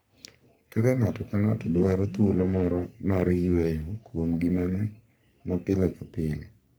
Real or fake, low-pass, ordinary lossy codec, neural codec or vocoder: fake; none; none; codec, 44.1 kHz, 3.4 kbps, Pupu-Codec